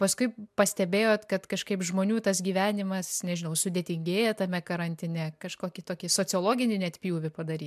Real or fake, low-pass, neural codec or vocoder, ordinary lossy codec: real; 14.4 kHz; none; MP3, 96 kbps